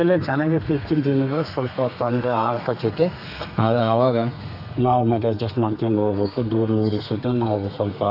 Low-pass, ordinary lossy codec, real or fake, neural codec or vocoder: 5.4 kHz; AAC, 48 kbps; fake; codec, 32 kHz, 1.9 kbps, SNAC